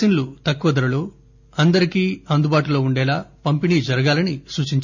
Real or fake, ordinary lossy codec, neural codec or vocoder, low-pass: real; none; none; 7.2 kHz